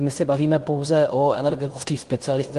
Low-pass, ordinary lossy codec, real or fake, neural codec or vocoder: 10.8 kHz; Opus, 24 kbps; fake; codec, 16 kHz in and 24 kHz out, 0.9 kbps, LongCat-Audio-Codec, fine tuned four codebook decoder